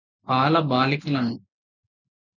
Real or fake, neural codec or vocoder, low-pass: real; none; 7.2 kHz